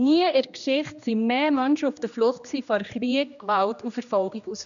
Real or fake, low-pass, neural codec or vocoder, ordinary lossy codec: fake; 7.2 kHz; codec, 16 kHz, 1 kbps, X-Codec, HuBERT features, trained on general audio; none